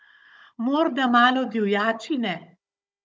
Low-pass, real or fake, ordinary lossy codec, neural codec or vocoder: none; fake; none; codec, 16 kHz, 16 kbps, FunCodec, trained on Chinese and English, 50 frames a second